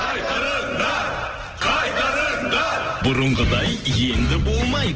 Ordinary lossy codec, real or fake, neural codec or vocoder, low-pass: Opus, 16 kbps; real; none; 7.2 kHz